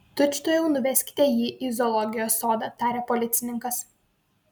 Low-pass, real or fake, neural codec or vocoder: 19.8 kHz; real; none